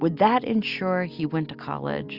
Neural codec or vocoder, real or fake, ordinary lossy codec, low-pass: none; real; Opus, 64 kbps; 5.4 kHz